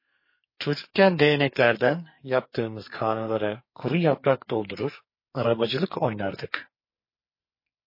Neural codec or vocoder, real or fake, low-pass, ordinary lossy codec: codec, 44.1 kHz, 2.6 kbps, SNAC; fake; 5.4 kHz; MP3, 24 kbps